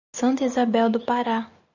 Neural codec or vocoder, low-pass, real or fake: none; 7.2 kHz; real